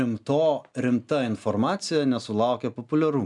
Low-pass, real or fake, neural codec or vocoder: 10.8 kHz; real; none